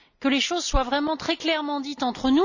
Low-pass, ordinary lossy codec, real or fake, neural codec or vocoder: 7.2 kHz; none; real; none